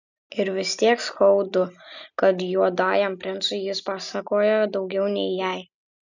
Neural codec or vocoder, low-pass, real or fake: none; 7.2 kHz; real